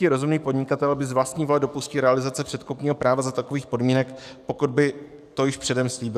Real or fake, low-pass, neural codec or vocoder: fake; 14.4 kHz; codec, 44.1 kHz, 7.8 kbps, DAC